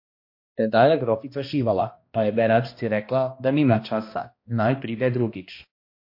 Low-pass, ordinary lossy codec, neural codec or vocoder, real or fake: 5.4 kHz; MP3, 32 kbps; codec, 16 kHz, 1 kbps, X-Codec, HuBERT features, trained on balanced general audio; fake